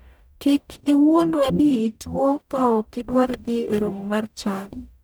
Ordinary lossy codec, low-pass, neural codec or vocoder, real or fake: none; none; codec, 44.1 kHz, 0.9 kbps, DAC; fake